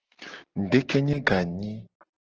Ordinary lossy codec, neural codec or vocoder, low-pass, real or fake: Opus, 16 kbps; none; 7.2 kHz; real